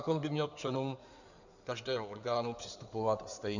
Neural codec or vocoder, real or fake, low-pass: codec, 16 kHz in and 24 kHz out, 2.2 kbps, FireRedTTS-2 codec; fake; 7.2 kHz